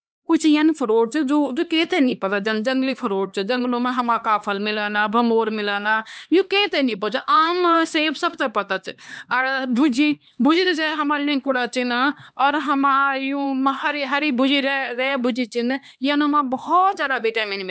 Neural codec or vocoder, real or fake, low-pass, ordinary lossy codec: codec, 16 kHz, 2 kbps, X-Codec, HuBERT features, trained on LibriSpeech; fake; none; none